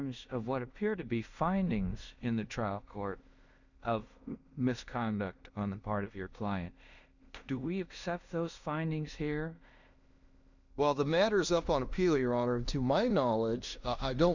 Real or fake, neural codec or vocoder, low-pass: fake; codec, 16 kHz in and 24 kHz out, 0.9 kbps, LongCat-Audio-Codec, fine tuned four codebook decoder; 7.2 kHz